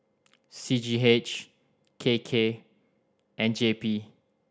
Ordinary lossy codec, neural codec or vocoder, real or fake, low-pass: none; none; real; none